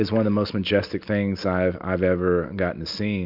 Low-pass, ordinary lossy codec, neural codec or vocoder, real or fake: 5.4 kHz; MP3, 48 kbps; none; real